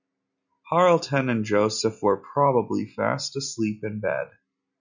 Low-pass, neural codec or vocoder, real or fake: 7.2 kHz; none; real